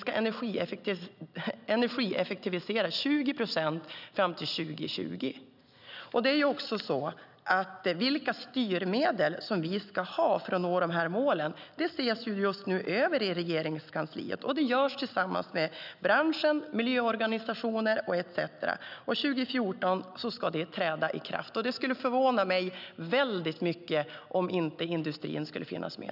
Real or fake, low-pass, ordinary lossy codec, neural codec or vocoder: real; 5.4 kHz; none; none